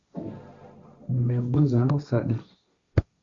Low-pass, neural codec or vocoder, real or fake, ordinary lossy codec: 7.2 kHz; codec, 16 kHz, 1.1 kbps, Voila-Tokenizer; fake; Opus, 64 kbps